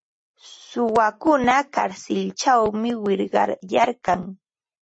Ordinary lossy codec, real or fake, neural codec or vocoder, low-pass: MP3, 32 kbps; real; none; 9.9 kHz